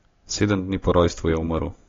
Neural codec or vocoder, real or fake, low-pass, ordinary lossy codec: none; real; 7.2 kHz; AAC, 32 kbps